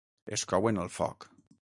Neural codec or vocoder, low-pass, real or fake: none; 10.8 kHz; real